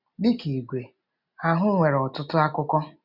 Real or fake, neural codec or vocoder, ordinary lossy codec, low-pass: real; none; none; 5.4 kHz